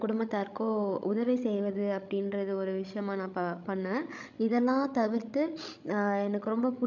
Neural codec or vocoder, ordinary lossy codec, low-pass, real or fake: codec, 16 kHz, 16 kbps, FreqCodec, larger model; none; 7.2 kHz; fake